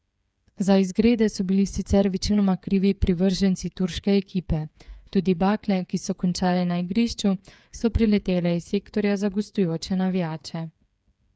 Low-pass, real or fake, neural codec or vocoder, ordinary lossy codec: none; fake; codec, 16 kHz, 8 kbps, FreqCodec, smaller model; none